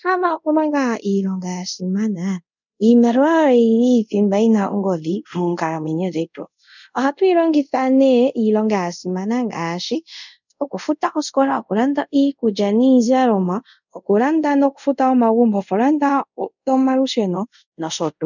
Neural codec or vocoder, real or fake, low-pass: codec, 24 kHz, 0.5 kbps, DualCodec; fake; 7.2 kHz